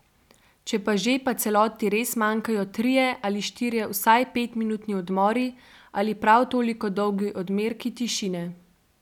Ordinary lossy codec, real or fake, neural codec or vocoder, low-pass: none; real; none; 19.8 kHz